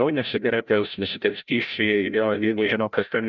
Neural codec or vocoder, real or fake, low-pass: codec, 16 kHz, 0.5 kbps, FreqCodec, larger model; fake; 7.2 kHz